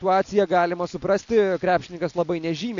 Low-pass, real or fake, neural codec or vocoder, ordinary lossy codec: 7.2 kHz; real; none; AAC, 64 kbps